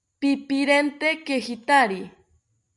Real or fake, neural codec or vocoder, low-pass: real; none; 10.8 kHz